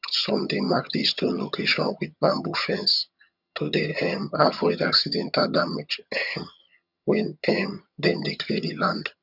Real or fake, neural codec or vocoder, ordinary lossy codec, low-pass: fake; vocoder, 22.05 kHz, 80 mel bands, HiFi-GAN; none; 5.4 kHz